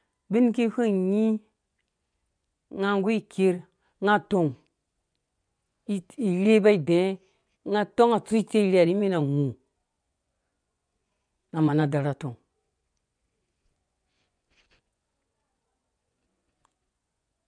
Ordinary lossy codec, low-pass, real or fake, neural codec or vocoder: none; 9.9 kHz; fake; vocoder, 24 kHz, 100 mel bands, Vocos